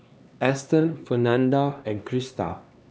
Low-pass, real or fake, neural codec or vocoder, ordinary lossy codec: none; fake; codec, 16 kHz, 2 kbps, X-Codec, HuBERT features, trained on LibriSpeech; none